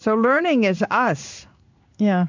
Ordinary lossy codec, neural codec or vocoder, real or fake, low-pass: MP3, 64 kbps; codec, 16 kHz in and 24 kHz out, 1 kbps, XY-Tokenizer; fake; 7.2 kHz